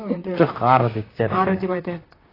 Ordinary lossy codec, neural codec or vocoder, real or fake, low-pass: AAC, 24 kbps; vocoder, 44.1 kHz, 128 mel bands, Pupu-Vocoder; fake; 5.4 kHz